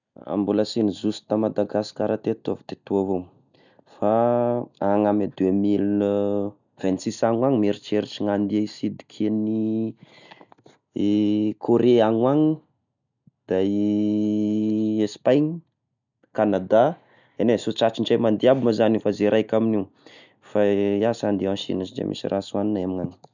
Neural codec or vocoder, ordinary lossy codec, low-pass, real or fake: none; none; 7.2 kHz; real